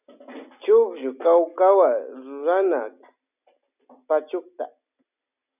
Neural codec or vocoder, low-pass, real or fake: none; 3.6 kHz; real